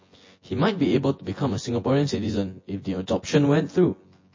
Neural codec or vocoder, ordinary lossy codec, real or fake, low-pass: vocoder, 24 kHz, 100 mel bands, Vocos; MP3, 32 kbps; fake; 7.2 kHz